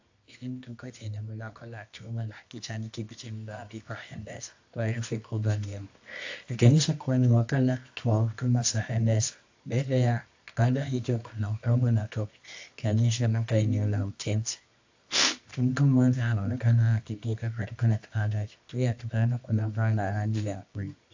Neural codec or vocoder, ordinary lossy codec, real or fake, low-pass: codec, 24 kHz, 0.9 kbps, WavTokenizer, medium music audio release; AAC, 48 kbps; fake; 7.2 kHz